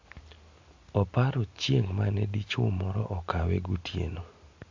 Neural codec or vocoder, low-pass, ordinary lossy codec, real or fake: none; 7.2 kHz; MP3, 48 kbps; real